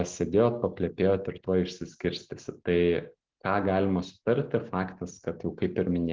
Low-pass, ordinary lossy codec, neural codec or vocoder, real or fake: 7.2 kHz; Opus, 16 kbps; none; real